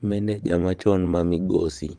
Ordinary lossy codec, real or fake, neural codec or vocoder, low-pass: Opus, 32 kbps; fake; vocoder, 22.05 kHz, 80 mel bands, WaveNeXt; 9.9 kHz